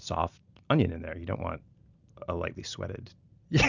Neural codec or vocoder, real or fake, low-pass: none; real; 7.2 kHz